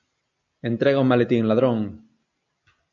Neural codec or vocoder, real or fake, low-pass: none; real; 7.2 kHz